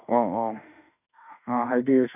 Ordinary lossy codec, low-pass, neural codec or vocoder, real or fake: none; 3.6 kHz; autoencoder, 48 kHz, 32 numbers a frame, DAC-VAE, trained on Japanese speech; fake